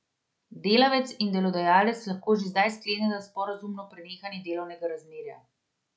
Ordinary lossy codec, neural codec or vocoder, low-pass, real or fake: none; none; none; real